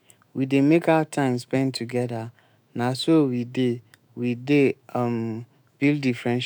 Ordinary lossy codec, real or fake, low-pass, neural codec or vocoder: none; fake; none; autoencoder, 48 kHz, 128 numbers a frame, DAC-VAE, trained on Japanese speech